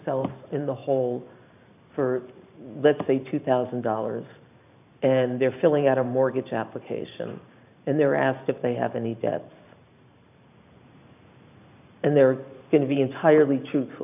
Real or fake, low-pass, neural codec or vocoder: fake; 3.6 kHz; vocoder, 44.1 kHz, 128 mel bands every 512 samples, BigVGAN v2